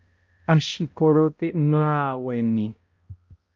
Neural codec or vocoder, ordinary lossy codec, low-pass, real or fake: codec, 16 kHz, 0.5 kbps, X-Codec, HuBERT features, trained on balanced general audio; Opus, 24 kbps; 7.2 kHz; fake